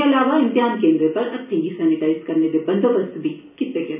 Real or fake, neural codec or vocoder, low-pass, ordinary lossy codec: real; none; 3.6 kHz; none